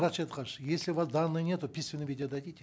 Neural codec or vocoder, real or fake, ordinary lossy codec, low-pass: none; real; none; none